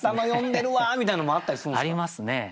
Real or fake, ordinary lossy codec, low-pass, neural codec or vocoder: real; none; none; none